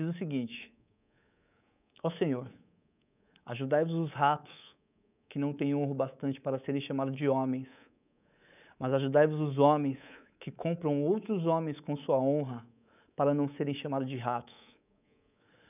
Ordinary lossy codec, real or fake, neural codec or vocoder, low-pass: none; fake; codec, 24 kHz, 3.1 kbps, DualCodec; 3.6 kHz